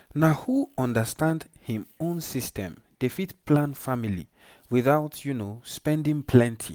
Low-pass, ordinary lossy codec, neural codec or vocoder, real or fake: none; none; none; real